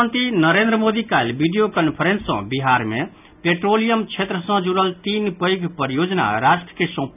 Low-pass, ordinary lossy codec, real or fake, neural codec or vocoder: 3.6 kHz; none; real; none